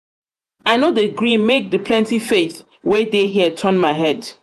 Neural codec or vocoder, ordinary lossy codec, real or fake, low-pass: vocoder, 48 kHz, 128 mel bands, Vocos; none; fake; 14.4 kHz